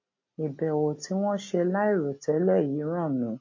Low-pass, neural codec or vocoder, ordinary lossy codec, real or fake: 7.2 kHz; none; MP3, 32 kbps; real